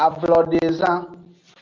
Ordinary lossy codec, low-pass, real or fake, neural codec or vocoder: Opus, 32 kbps; 7.2 kHz; real; none